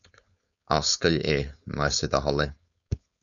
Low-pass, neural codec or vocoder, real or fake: 7.2 kHz; codec, 16 kHz, 4.8 kbps, FACodec; fake